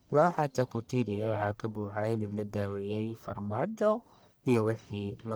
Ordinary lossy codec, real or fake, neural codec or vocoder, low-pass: none; fake; codec, 44.1 kHz, 1.7 kbps, Pupu-Codec; none